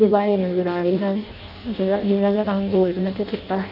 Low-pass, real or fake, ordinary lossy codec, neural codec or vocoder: 5.4 kHz; fake; none; codec, 16 kHz in and 24 kHz out, 0.6 kbps, FireRedTTS-2 codec